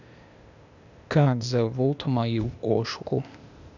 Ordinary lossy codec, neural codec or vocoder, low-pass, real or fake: none; codec, 16 kHz, 0.8 kbps, ZipCodec; 7.2 kHz; fake